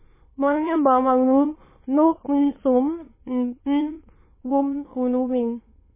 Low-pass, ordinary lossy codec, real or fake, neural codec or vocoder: 3.6 kHz; MP3, 16 kbps; fake; autoencoder, 22.05 kHz, a latent of 192 numbers a frame, VITS, trained on many speakers